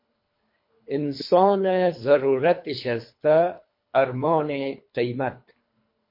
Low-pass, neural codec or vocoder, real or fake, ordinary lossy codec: 5.4 kHz; codec, 24 kHz, 3 kbps, HILCodec; fake; MP3, 32 kbps